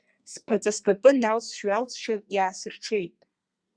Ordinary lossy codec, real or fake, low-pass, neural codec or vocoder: Opus, 64 kbps; fake; 9.9 kHz; codec, 32 kHz, 1.9 kbps, SNAC